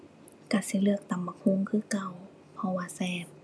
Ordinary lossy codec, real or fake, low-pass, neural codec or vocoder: none; real; none; none